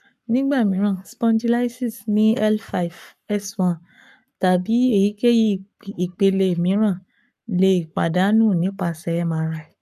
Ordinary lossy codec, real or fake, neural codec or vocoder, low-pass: none; fake; codec, 44.1 kHz, 7.8 kbps, Pupu-Codec; 14.4 kHz